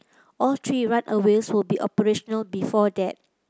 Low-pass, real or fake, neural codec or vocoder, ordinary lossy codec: none; real; none; none